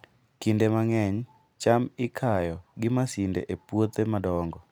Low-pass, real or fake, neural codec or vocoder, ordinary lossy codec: none; real; none; none